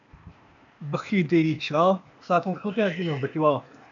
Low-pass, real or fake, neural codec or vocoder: 7.2 kHz; fake; codec, 16 kHz, 0.8 kbps, ZipCodec